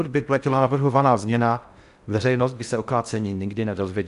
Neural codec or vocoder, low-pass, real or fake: codec, 16 kHz in and 24 kHz out, 0.6 kbps, FocalCodec, streaming, 4096 codes; 10.8 kHz; fake